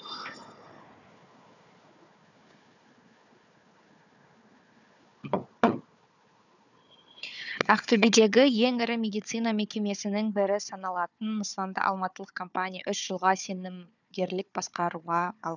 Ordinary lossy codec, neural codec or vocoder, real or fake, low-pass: none; codec, 16 kHz, 4 kbps, FunCodec, trained on Chinese and English, 50 frames a second; fake; 7.2 kHz